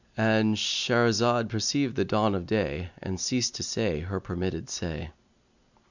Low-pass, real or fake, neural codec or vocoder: 7.2 kHz; real; none